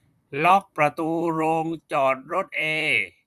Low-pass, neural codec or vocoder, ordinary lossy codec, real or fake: 14.4 kHz; vocoder, 44.1 kHz, 128 mel bands, Pupu-Vocoder; none; fake